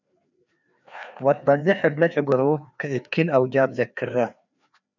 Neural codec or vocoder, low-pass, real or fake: codec, 16 kHz, 2 kbps, FreqCodec, larger model; 7.2 kHz; fake